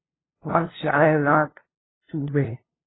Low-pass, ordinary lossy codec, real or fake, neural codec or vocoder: 7.2 kHz; AAC, 16 kbps; fake; codec, 16 kHz, 0.5 kbps, FunCodec, trained on LibriTTS, 25 frames a second